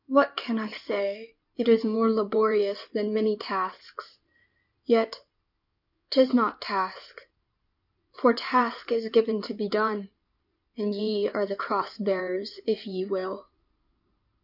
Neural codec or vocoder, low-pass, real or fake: codec, 16 kHz in and 24 kHz out, 2.2 kbps, FireRedTTS-2 codec; 5.4 kHz; fake